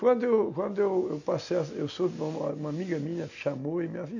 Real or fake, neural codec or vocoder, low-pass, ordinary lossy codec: real; none; 7.2 kHz; none